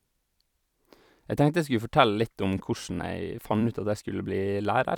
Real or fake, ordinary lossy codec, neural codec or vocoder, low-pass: fake; none; vocoder, 44.1 kHz, 128 mel bands every 256 samples, BigVGAN v2; 19.8 kHz